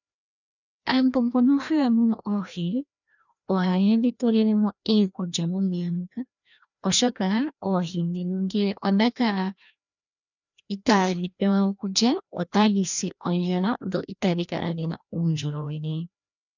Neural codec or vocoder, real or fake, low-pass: codec, 16 kHz, 1 kbps, FreqCodec, larger model; fake; 7.2 kHz